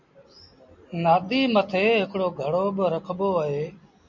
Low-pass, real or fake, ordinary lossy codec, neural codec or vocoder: 7.2 kHz; real; MP3, 64 kbps; none